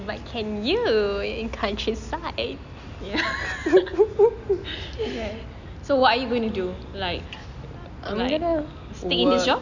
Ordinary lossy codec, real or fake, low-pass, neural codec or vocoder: none; real; 7.2 kHz; none